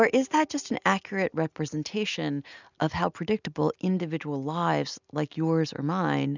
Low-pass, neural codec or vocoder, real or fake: 7.2 kHz; none; real